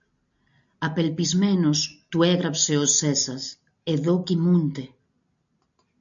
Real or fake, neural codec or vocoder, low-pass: real; none; 7.2 kHz